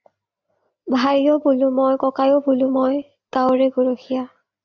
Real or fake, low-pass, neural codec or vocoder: real; 7.2 kHz; none